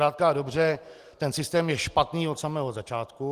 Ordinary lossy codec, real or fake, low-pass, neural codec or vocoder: Opus, 24 kbps; real; 14.4 kHz; none